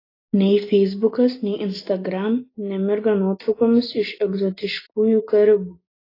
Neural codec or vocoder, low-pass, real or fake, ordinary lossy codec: none; 5.4 kHz; real; AAC, 24 kbps